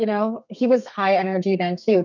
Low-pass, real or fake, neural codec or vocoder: 7.2 kHz; fake; codec, 32 kHz, 1.9 kbps, SNAC